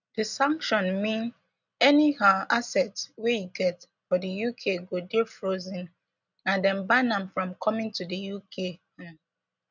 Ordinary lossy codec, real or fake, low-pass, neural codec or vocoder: none; real; 7.2 kHz; none